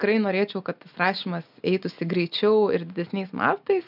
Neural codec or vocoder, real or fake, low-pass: none; real; 5.4 kHz